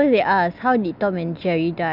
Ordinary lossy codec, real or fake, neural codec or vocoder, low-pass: none; real; none; 5.4 kHz